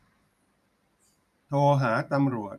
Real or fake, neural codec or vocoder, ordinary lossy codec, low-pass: fake; vocoder, 44.1 kHz, 128 mel bands every 512 samples, BigVGAN v2; none; 14.4 kHz